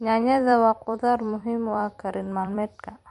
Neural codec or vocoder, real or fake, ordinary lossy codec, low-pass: vocoder, 44.1 kHz, 128 mel bands every 256 samples, BigVGAN v2; fake; MP3, 48 kbps; 14.4 kHz